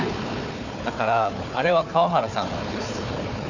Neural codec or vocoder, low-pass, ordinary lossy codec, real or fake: codec, 16 kHz, 4 kbps, FunCodec, trained on Chinese and English, 50 frames a second; 7.2 kHz; none; fake